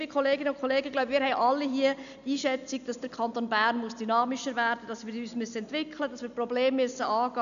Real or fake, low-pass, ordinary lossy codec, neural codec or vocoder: real; 7.2 kHz; none; none